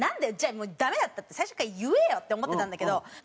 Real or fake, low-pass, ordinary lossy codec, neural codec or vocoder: real; none; none; none